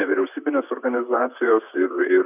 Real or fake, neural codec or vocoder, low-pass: fake; vocoder, 44.1 kHz, 128 mel bands, Pupu-Vocoder; 3.6 kHz